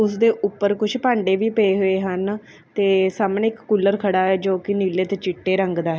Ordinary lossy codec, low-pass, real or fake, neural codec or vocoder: none; none; real; none